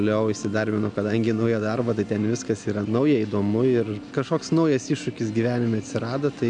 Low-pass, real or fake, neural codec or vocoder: 9.9 kHz; real; none